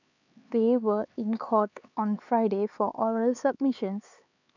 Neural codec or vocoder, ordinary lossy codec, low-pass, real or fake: codec, 16 kHz, 4 kbps, X-Codec, HuBERT features, trained on LibriSpeech; none; 7.2 kHz; fake